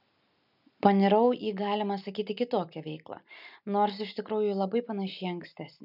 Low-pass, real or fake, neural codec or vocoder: 5.4 kHz; real; none